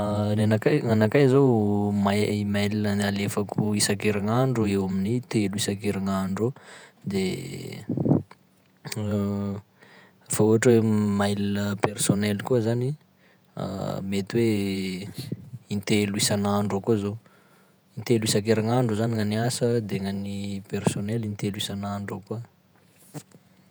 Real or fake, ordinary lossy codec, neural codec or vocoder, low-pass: fake; none; vocoder, 48 kHz, 128 mel bands, Vocos; none